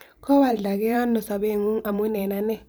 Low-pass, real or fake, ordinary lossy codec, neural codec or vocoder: none; fake; none; vocoder, 44.1 kHz, 128 mel bands every 512 samples, BigVGAN v2